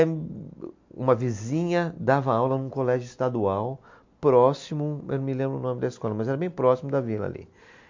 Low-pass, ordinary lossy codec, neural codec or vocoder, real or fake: 7.2 kHz; MP3, 48 kbps; none; real